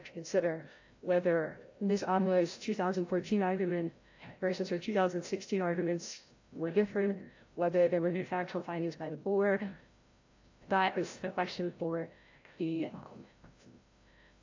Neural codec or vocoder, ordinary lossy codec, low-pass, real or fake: codec, 16 kHz, 0.5 kbps, FreqCodec, larger model; AAC, 48 kbps; 7.2 kHz; fake